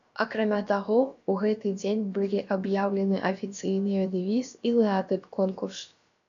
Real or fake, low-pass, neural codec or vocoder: fake; 7.2 kHz; codec, 16 kHz, about 1 kbps, DyCAST, with the encoder's durations